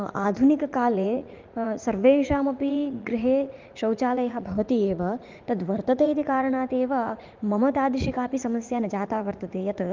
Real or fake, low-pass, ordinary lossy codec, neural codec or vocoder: fake; 7.2 kHz; Opus, 32 kbps; vocoder, 44.1 kHz, 80 mel bands, Vocos